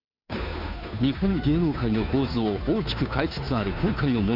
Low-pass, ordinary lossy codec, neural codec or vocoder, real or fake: 5.4 kHz; none; codec, 16 kHz, 2 kbps, FunCodec, trained on Chinese and English, 25 frames a second; fake